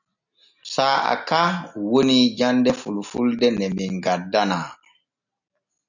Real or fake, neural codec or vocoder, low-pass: real; none; 7.2 kHz